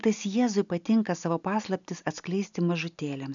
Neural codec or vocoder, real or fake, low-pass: none; real; 7.2 kHz